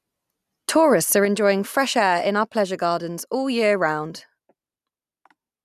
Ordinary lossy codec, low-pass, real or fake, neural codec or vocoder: none; 14.4 kHz; real; none